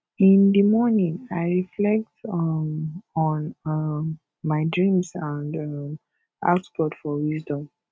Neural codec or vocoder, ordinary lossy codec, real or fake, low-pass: none; none; real; none